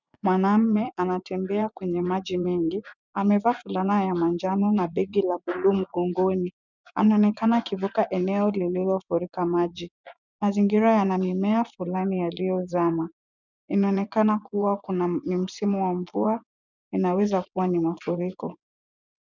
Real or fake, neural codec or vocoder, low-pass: fake; vocoder, 24 kHz, 100 mel bands, Vocos; 7.2 kHz